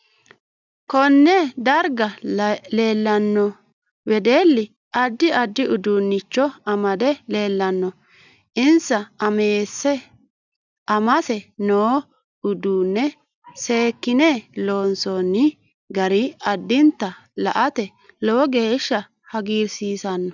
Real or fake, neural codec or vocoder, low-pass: real; none; 7.2 kHz